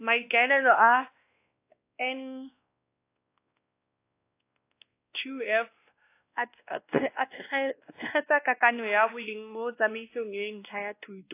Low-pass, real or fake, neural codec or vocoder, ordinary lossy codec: 3.6 kHz; fake; codec, 16 kHz, 1 kbps, X-Codec, WavLM features, trained on Multilingual LibriSpeech; AAC, 24 kbps